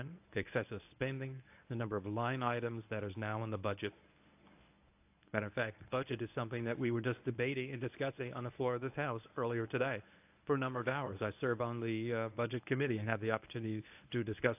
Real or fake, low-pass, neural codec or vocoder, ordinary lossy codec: fake; 3.6 kHz; codec, 24 kHz, 0.9 kbps, WavTokenizer, medium speech release version 1; AAC, 32 kbps